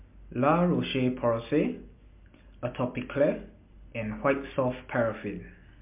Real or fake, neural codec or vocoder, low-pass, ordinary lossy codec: real; none; 3.6 kHz; MP3, 32 kbps